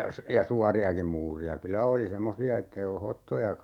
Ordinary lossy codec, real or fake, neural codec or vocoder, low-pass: none; fake; codec, 44.1 kHz, 7.8 kbps, DAC; 19.8 kHz